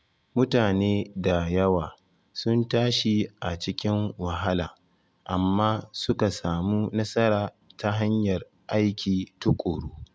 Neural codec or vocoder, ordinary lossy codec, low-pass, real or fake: none; none; none; real